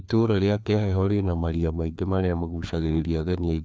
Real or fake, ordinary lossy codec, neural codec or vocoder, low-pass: fake; none; codec, 16 kHz, 2 kbps, FreqCodec, larger model; none